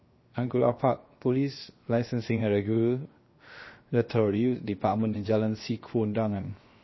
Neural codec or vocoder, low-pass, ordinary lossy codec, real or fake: codec, 16 kHz, 0.7 kbps, FocalCodec; 7.2 kHz; MP3, 24 kbps; fake